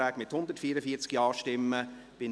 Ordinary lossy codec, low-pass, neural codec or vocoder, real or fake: none; none; none; real